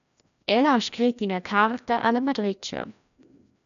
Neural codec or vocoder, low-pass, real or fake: codec, 16 kHz, 1 kbps, FreqCodec, larger model; 7.2 kHz; fake